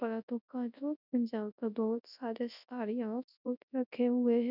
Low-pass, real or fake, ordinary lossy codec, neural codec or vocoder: 5.4 kHz; fake; none; codec, 24 kHz, 0.9 kbps, WavTokenizer, large speech release